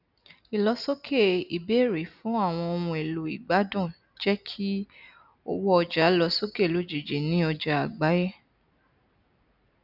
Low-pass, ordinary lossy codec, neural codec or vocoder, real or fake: 5.4 kHz; none; none; real